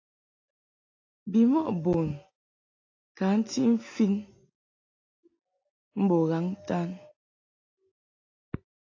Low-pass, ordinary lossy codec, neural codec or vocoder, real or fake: 7.2 kHz; AAC, 32 kbps; none; real